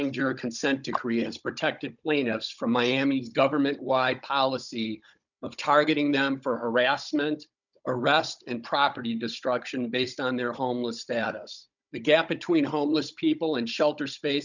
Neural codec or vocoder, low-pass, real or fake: codec, 16 kHz, 16 kbps, FunCodec, trained on Chinese and English, 50 frames a second; 7.2 kHz; fake